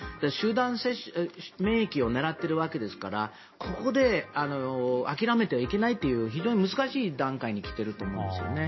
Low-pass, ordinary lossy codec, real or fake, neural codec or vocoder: 7.2 kHz; MP3, 24 kbps; real; none